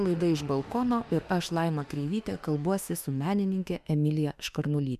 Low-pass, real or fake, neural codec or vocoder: 14.4 kHz; fake; autoencoder, 48 kHz, 32 numbers a frame, DAC-VAE, trained on Japanese speech